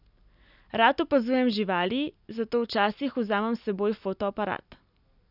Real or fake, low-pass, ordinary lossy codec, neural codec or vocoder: real; 5.4 kHz; none; none